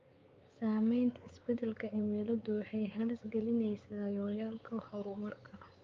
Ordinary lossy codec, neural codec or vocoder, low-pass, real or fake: Opus, 32 kbps; codec, 16 kHz, 4 kbps, X-Codec, WavLM features, trained on Multilingual LibriSpeech; 7.2 kHz; fake